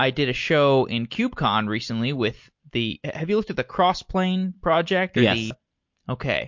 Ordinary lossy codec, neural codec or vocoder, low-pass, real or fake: MP3, 48 kbps; none; 7.2 kHz; real